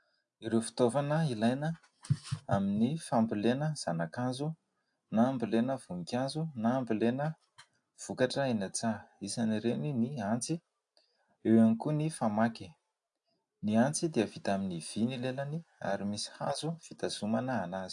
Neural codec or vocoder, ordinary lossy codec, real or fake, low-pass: none; AAC, 64 kbps; real; 10.8 kHz